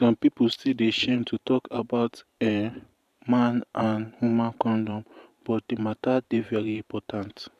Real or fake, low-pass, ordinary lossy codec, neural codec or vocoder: fake; 14.4 kHz; none; vocoder, 44.1 kHz, 128 mel bands every 512 samples, BigVGAN v2